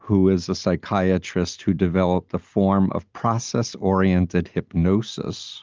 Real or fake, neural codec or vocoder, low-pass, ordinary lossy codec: real; none; 7.2 kHz; Opus, 24 kbps